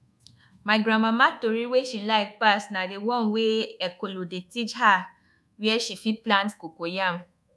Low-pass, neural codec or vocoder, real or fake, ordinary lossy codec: none; codec, 24 kHz, 1.2 kbps, DualCodec; fake; none